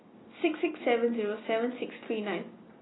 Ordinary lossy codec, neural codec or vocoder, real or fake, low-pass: AAC, 16 kbps; none; real; 7.2 kHz